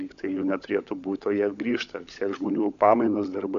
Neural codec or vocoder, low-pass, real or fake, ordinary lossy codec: codec, 16 kHz, 16 kbps, FunCodec, trained on LibriTTS, 50 frames a second; 7.2 kHz; fake; AAC, 96 kbps